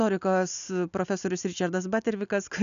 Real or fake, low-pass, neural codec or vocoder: real; 7.2 kHz; none